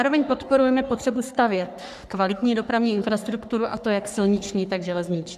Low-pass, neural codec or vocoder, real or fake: 14.4 kHz; codec, 44.1 kHz, 3.4 kbps, Pupu-Codec; fake